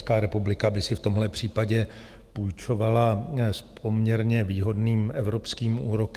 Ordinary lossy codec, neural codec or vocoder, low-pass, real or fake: Opus, 24 kbps; autoencoder, 48 kHz, 128 numbers a frame, DAC-VAE, trained on Japanese speech; 14.4 kHz; fake